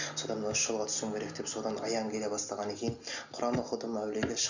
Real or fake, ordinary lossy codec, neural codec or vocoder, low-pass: real; none; none; 7.2 kHz